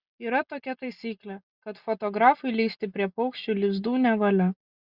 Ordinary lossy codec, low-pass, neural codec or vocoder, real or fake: Opus, 64 kbps; 5.4 kHz; none; real